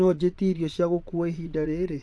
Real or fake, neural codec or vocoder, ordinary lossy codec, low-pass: fake; vocoder, 22.05 kHz, 80 mel bands, Vocos; none; none